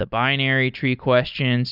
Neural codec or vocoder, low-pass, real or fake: none; 5.4 kHz; real